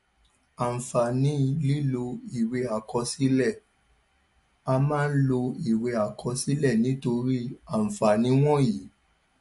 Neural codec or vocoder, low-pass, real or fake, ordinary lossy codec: none; 14.4 kHz; real; MP3, 48 kbps